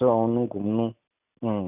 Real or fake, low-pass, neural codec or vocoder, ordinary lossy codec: real; 3.6 kHz; none; none